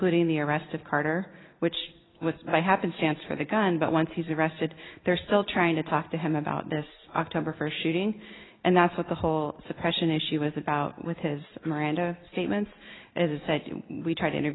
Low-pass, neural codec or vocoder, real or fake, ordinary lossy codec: 7.2 kHz; none; real; AAC, 16 kbps